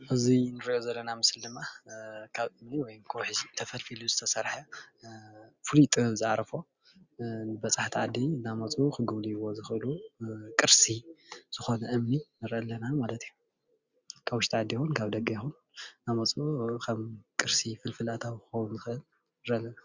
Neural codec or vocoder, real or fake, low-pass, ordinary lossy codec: none; real; 7.2 kHz; Opus, 64 kbps